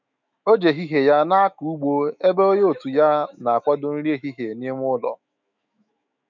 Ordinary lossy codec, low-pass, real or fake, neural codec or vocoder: none; 7.2 kHz; fake; autoencoder, 48 kHz, 128 numbers a frame, DAC-VAE, trained on Japanese speech